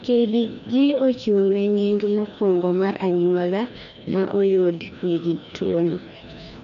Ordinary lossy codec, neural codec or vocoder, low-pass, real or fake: none; codec, 16 kHz, 1 kbps, FreqCodec, larger model; 7.2 kHz; fake